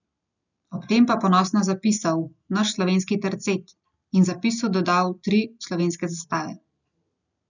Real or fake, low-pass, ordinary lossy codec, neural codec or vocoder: real; 7.2 kHz; none; none